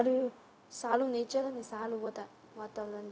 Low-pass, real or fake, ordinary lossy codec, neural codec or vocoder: none; fake; none; codec, 16 kHz, 0.4 kbps, LongCat-Audio-Codec